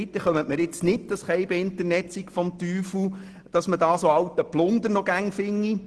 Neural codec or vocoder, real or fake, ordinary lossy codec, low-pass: none; real; Opus, 16 kbps; 10.8 kHz